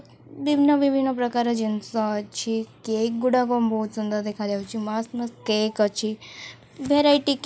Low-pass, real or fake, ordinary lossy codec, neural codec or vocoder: none; real; none; none